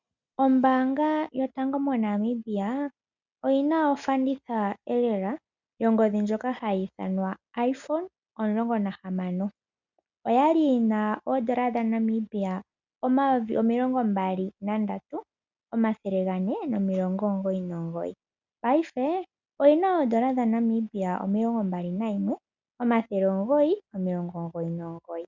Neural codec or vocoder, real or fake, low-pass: none; real; 7.2 kHz